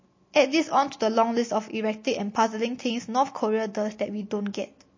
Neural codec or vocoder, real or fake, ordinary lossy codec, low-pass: none; real; MP3, 32 kbps; 7.2 kHz